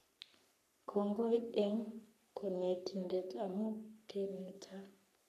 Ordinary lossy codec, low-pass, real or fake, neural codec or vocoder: none; 14.4 kHz; fake; codec, 44.1 kHz, 3.4 kbps, Pupu-Codec